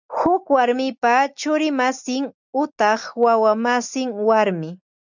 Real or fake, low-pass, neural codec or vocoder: real; 7.2 kHz; none